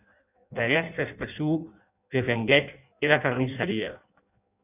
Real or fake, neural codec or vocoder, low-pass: fake; codec, 16 kHz in and 24 kHz out, 0.6 kbps, FireRedTTS-2 codec; 3.6 kHz